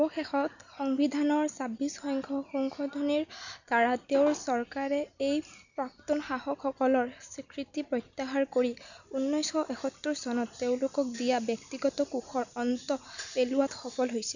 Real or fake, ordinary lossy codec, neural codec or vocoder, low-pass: real; none; none; 7.2 kHz